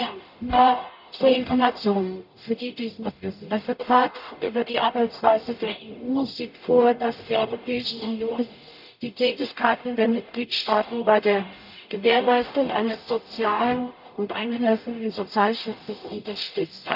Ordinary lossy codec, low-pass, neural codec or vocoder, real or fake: none; 5.4 kHz; codec, 44.1 kHz, 0.9 kbps, DAC; fake